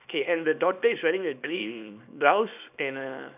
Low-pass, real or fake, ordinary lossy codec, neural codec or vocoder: 3.6 kHz; fake; none; codec, 24 kHz, 0.9 kbps, WavTokenizer, small release